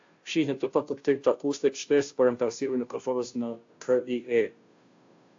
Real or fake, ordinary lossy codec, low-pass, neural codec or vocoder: fake; AAC, 48 kbps; 7.2 kHz; codec, 16 kHz, 0.5 kbps, FunCodec, trained on Chinese and English, 25 frames a second